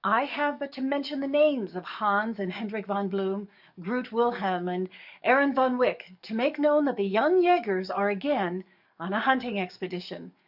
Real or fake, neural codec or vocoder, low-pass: fake; codec, 44.1 kHz, 7.8 kbps, DAC; 5.4 kHz